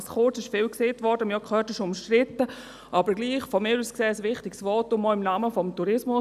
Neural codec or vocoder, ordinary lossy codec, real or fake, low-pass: none; none; real; 14.4 kHz